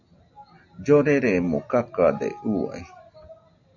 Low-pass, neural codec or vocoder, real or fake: 7.2 kHz; none; real